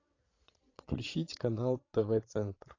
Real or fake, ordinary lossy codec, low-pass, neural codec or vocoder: fake; AAC, 32 kbps; 7.2 kHz; vocoder, 22.05 kHz, 80 mel bands, Vocos